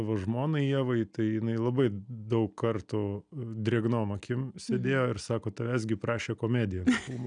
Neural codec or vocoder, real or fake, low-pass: none; real; 9.9 kHz